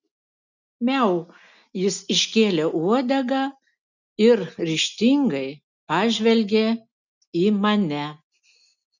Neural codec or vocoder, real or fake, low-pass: none; real; 7.2 kHz